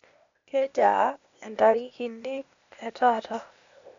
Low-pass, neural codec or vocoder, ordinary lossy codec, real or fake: 7.2 kHz; codec, 16 kHz, 0.8 kbps, ZipCodec; MP3, 64 kbps; fake